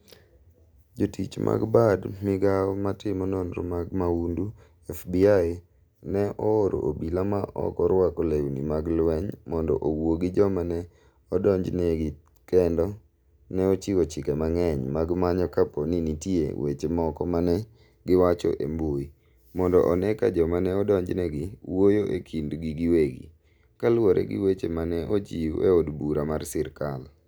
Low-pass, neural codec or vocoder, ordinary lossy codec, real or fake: none; none; none; real